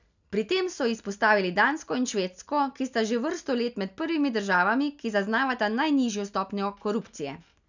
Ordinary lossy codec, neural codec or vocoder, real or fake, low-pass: none; none; real; 7.2 kHz